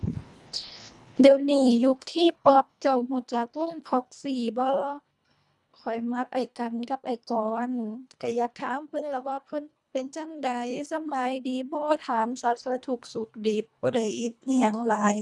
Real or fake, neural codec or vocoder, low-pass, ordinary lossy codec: fake; codec, 24 kHz, 1.5 kbps, HILCodec; none; none